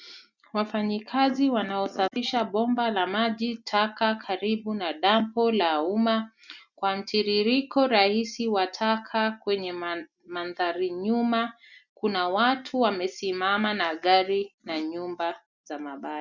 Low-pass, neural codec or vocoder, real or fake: 7.2 kHz; none; real